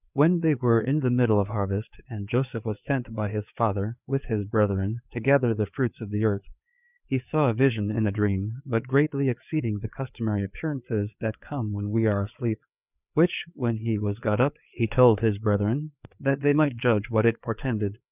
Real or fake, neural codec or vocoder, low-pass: fake; codec, 16 kHz, 4 kbps, FreqCodec, larger model; 3.6 kHz